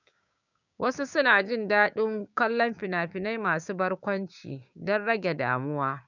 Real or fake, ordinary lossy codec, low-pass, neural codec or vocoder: fake; none; 7.2 kHz; codec, 16 kHz, 6 kbps, DAC